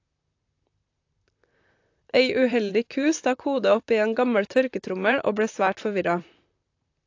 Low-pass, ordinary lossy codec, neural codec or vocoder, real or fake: 7.2 kHz; AAC, 48 kbps; vocoder, 44.1 kHz, 128 mel bands, Pupu-Vocoder; fake